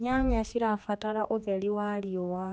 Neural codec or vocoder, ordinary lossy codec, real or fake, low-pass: codec, 16 kHz, 2 kbps, X-Codec, HuBERT features, trained on general audio; none; fake; none